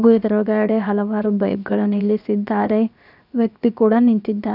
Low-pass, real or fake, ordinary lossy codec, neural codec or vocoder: 5.4 kHz; fake; none; codec, 16 kHz, 0.7 kbps, FocalCodec